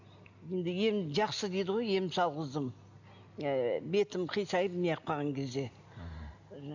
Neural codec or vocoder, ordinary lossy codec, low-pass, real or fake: none; none; 7.2 kHz; real